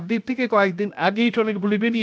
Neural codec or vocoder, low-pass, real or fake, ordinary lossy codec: codec, 16 kHz, 0.7 kbps, FocalCodec; none; fake; none